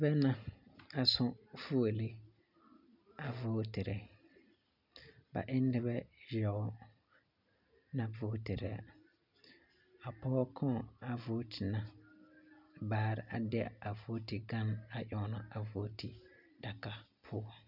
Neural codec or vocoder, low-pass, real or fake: none; 5.4 kHz; real